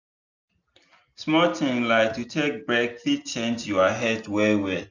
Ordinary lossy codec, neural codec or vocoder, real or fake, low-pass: none; none; real; 7.2 kHz